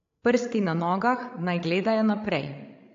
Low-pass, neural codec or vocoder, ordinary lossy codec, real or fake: 7.2 kHz; codec, 16 kHz, 16 kbps, FreqCodec, larger model; AAC, 48 kbps; fake